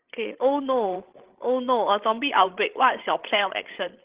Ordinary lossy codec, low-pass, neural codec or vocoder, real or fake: Opus, 16 kbps; 3.6 kHz; codec, 16 kHz, 16 kbps, FreqCodec, larger model; fake